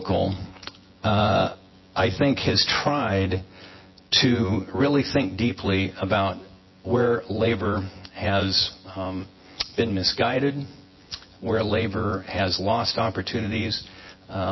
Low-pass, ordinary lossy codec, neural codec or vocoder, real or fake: 7.2 kHz; MP3, 24 kbps; vocoder, 24 kHz, 100 mel bands, Vocos; fake